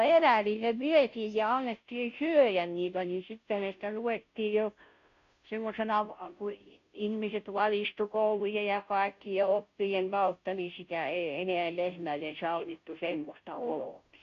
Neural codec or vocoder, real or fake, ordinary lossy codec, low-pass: codec, 16 kHz, 0.5 kbps, FunCodec, trained on Chinese and English, 25 frames a second; fake; none; 7.2 kHz